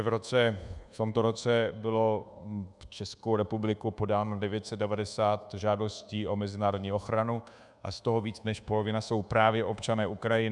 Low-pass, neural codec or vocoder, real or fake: 10.8 kHz; codec, 24 kHz, 1.2 kbps, DualCodec; fake